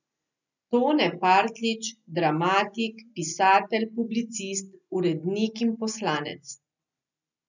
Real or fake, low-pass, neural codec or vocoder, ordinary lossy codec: real; 7.2 kHz; none; none